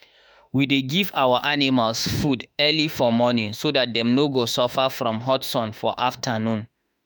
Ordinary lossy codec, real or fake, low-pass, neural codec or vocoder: none; fake; none; autoencoder, 48 kHz, 32 numbers a frame, DAC-VAE, trained on Japanese speech